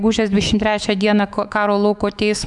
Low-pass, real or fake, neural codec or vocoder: 10.8 kHz; fake; autoencoder, 48 kHz, 128 numbers a frame, DAC-VAE, trained on Japanese speech